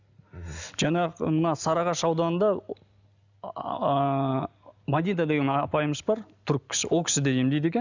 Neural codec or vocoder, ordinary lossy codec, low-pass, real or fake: none; none; 7.2 kHz; real